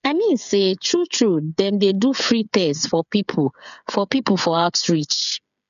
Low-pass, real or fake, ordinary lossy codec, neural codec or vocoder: 7.2 kHz; fake; none; codec, 16 kHz, 8 kbps, FreqCodec, smaller model